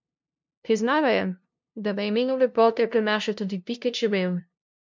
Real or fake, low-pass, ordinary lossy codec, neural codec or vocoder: fake; 7.2 kHz; MP3, 64 kbps; codec, 16 kHz, 0.5 kbps, FunCodec, trained on LibriTTS, 25 frames a second